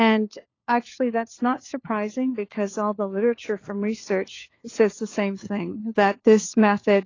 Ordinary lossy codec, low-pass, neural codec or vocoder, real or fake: AAC, 32 kbps; 7.2 kHz; codec, 16 kHz, 4 kbps, FunCodec, trained on LibriTTS, 50 frames a second; fake